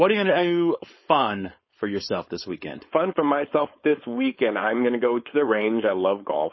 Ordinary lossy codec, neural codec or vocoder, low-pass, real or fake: MP3, 24 kbps; codec, 16 kHz, 4.8 kbps, FACodec; 7.2 kHz; fake